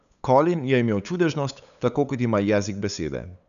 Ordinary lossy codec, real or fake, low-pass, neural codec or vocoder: none; fake; 7.2 kHz; codec, 16 kHz, 8 kbps, FunCodec, trained on LibriTTS, 25 frames a second